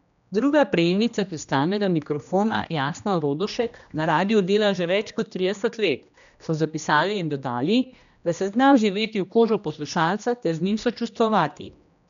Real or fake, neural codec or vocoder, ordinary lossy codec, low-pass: fake; codec, 16 kHz, 1 kbps, X-Codec, HuBERT features, trained on general audio; none; 7.2 kHz